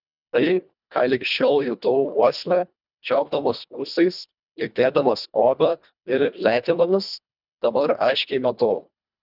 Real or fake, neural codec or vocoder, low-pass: fake; codec, 24 kHz, 1.5 kbps, HILCodec; 5.4 kHz